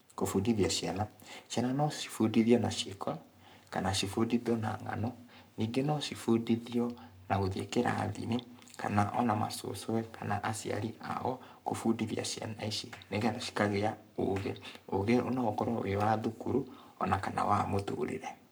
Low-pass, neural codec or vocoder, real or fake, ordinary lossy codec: none; codec, 44.1 kHz, 7.8 kbps, Pupu-Codec; fake; none